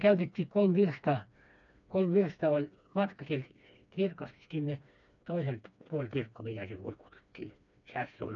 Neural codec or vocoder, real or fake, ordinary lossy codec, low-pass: codec, 16 kHz, 2 kbps, FreqCodec, smaller model; fake; none; 7.2 kHz